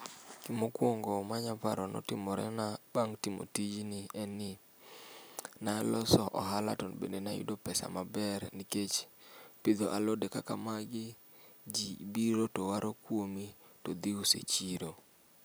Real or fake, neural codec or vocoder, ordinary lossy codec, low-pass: real; none; none; none